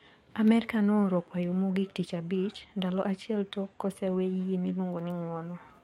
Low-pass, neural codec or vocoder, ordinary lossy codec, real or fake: 19.8 kHz; codec, 44.1 kHz, 7.8 kbps, DAC; MP3, 64 kbps; fake